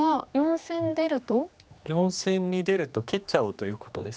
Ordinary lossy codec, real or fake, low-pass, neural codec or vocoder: none; fake; none; codec, 16 kHz, 2 kbps, X-Codec, HuBERT features, trained on general audio